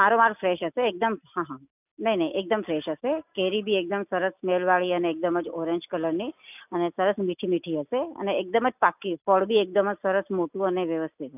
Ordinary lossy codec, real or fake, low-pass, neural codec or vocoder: none; real; 3.6 kHz; none